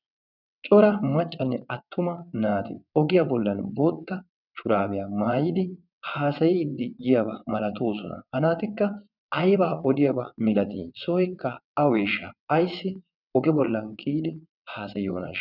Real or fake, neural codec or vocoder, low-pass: fake; vocoder, 22.05 kHz, 80 mel bands, WaveNeXt; 5.4 kHz